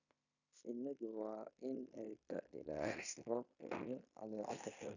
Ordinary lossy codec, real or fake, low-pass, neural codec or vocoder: none; fake; 7.2 kHz; codec, 16 kHz in and 24 kHz out, 0.9 kbps, LongCat-Audio-Codec, fine tuned four codebook decoder